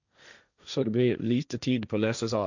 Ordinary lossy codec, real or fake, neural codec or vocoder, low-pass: none; fake; codec, 16 kHz, 1.1 kbps, Voila-Tokenizer; 7.2 kHz